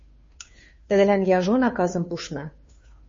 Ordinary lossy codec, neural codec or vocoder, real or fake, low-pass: MP3, 32 kbps; codec, 16 kHz, 2 kbps, FunCodec, trained on Chinese and English, 25 frames a second; fake; 7.2 kHz